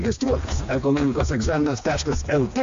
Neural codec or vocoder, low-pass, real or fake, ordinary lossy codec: codec, 16 kHz, 2 kbps, FreqCodec, smaller model; 7.2 kHz; fake; AAC, 48 kbps